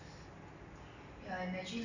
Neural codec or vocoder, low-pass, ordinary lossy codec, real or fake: none; 7.2 kHz; none; real